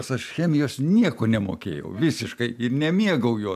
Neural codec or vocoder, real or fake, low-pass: none; real; 14.4 kHz